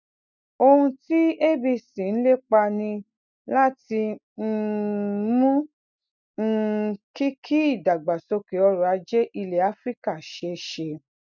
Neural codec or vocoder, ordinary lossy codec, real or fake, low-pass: none; none; real; 7.2 kHz